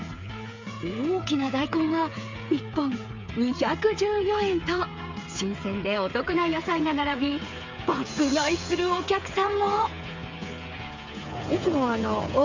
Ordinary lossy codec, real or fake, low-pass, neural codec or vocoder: MP3, 64 kbps; fake; 7.2 kHz; codec, 16 kHz, 8 kbps, FreqCodec, smaller model